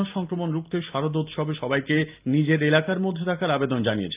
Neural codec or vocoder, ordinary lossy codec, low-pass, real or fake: none; Opus, 24 kbps; 3.6 kHz; real